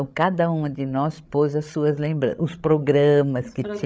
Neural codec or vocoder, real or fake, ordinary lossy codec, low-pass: codec, 16 kHz, 16 kbps, FreqCodec, larger model; fake; none; none